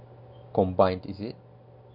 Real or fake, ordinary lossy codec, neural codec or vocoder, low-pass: real; none; none; 5.4 kHz